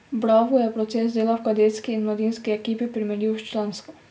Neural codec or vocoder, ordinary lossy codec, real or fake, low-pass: none; none; real; none